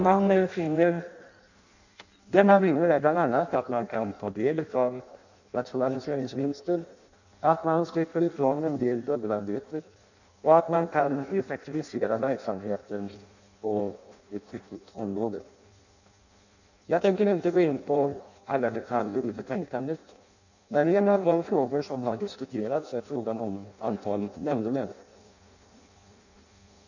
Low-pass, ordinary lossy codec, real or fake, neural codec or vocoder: 7.2 kHz; none; fake; codec, 16 kHz in and 24 kHz out, 0.6 kbps, FireRedTTS-2 codec